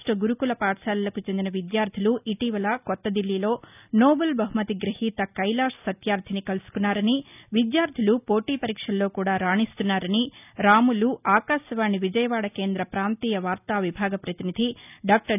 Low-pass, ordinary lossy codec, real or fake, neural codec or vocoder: 3.6 kHz; none; real; none